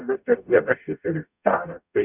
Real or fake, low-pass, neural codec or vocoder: fake; 3.6 kHz; codec, 44.1 kHz, 0.9 kbps, DAC